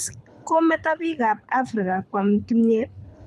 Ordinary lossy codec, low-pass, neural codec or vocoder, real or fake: none; none; codec, 24 kHz, 6 kbps, HILCodec; fake